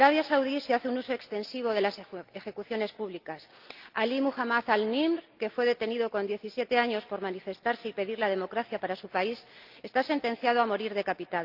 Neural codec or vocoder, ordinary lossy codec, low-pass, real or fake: none; Opus, 32 kbps; 5.4 kHz; real